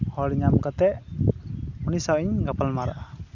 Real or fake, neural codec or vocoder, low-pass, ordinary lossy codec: real; none; 7.2 kHz; none